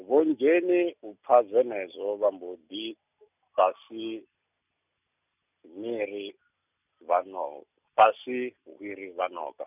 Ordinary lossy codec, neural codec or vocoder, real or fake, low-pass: none; none; real; 3.6 kHz